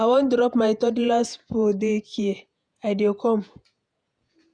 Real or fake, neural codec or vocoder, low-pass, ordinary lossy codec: fake; vocoder, 48 kHz, 128 mel bands, Vocos; 9.9 kHz; none